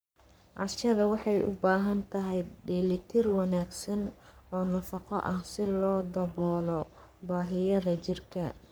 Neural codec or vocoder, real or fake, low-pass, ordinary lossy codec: codec, 44.1 kHz, 3.4 kbps, Pupu-Codec; fake; none; none